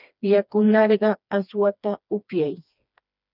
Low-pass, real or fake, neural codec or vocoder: 5.4 kHz; fake; codec, 16 kHz, 2 kbps, FreqCodec, smaller model